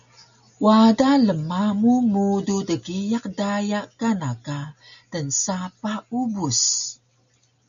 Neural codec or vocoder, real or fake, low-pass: none; real; 7.2 kHz